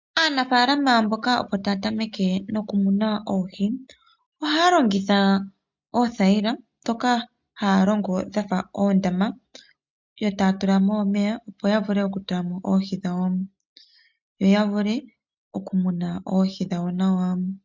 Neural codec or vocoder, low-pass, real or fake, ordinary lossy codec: none; 7.2 kHz; real; MP3, 64 kbps